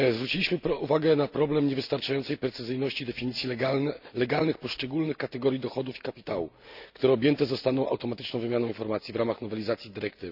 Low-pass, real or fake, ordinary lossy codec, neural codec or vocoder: 5.4 kHz; real; none; none